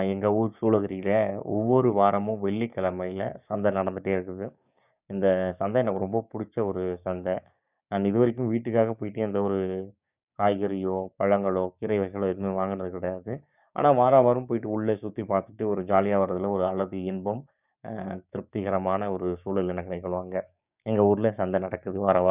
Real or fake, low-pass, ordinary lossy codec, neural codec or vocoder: fake; 3.6 kHz; none; codec, 16 kHz, 6 kbps, DAC